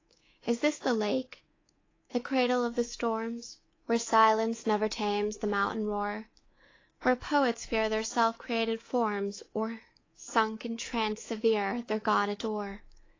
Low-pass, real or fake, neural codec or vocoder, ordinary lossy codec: 7.2 kHz; fake; codec, 24 kHz, 3.1 kbps, DualCodec; AAC, 32 kbps